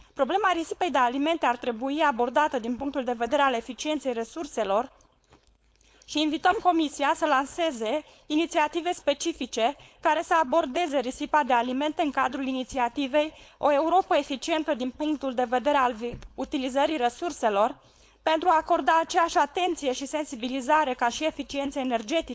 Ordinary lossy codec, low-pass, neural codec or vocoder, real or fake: none; none; codec, 16 kHz, 4.8 kbps, FACodec; fake